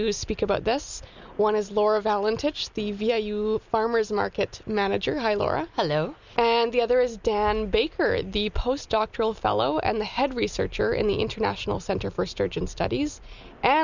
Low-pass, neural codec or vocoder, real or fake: 7.2 kHz; none; real